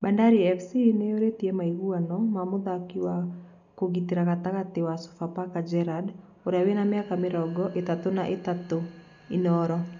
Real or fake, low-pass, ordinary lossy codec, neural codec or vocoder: real; 7.2 kHz; none; none